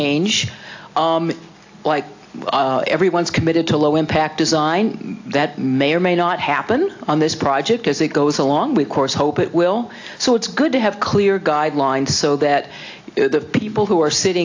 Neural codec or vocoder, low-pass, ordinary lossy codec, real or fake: none; 7.2 kHz; AAC, 48 kbps; real